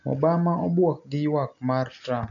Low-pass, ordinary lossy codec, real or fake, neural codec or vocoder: 7.2 kHz; none; real; none